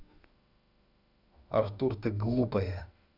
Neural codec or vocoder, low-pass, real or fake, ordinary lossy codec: autoencoder, 48 kHz, 32 numbers a frame, DAC-VAE, trained on Japanese speech; 5.4 kHz; fake; none